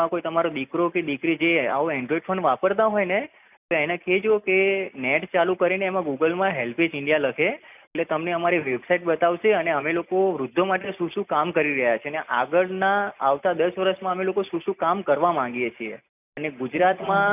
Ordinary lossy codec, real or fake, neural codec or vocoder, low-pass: none; real; none; 3.6 kHz